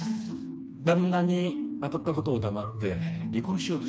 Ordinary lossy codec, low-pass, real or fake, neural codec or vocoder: none; none; fake; codec, 16 kHz, 2 kbps, FreqCodec, smaller model